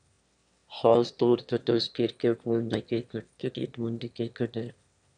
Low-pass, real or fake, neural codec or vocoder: 9.9 kHz; fake; autoencoder, 22.05 kHz, a latent of 192 numbers a frame, VITS, trained on one speaker